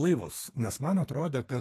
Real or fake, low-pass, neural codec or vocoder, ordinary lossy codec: fake; 14.4 kHz; codec, 32 kHz, 1.9 kbps, SNAC; AAC, 48 kbps